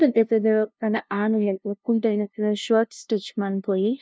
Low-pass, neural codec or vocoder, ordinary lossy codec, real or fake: none; codec, 16 kHz, 0.5 kbps, FunCodec, trained on LibriTTS, 25 frames a second; none; fake